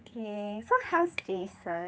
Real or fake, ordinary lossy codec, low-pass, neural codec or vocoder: fake; none; none; codec, 16 kHz, 4 kbps, X-Codec, HuBERT features, trained on general audio